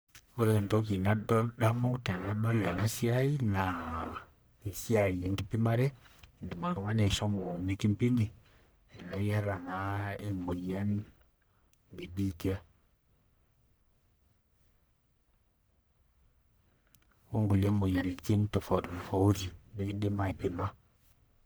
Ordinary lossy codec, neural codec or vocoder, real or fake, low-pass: none; codec, 44.1 kHz, 1.7 kbps, Pupu-Codec; fake; none